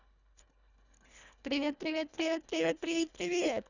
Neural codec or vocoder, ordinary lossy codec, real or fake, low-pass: codec, 24 kHz, 1.5 kbps, HILCodec; none; fake; 7.2 kHz